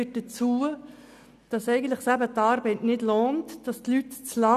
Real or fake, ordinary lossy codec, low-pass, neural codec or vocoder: real; none; 14.4 kHz; none